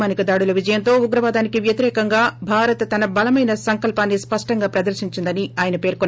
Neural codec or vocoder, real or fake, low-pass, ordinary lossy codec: none; real; none; none